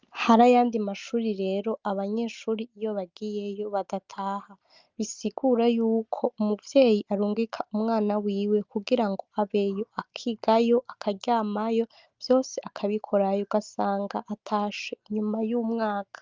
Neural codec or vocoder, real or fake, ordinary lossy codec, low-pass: none; real; Opus, 24 kbps; 7.2 kHz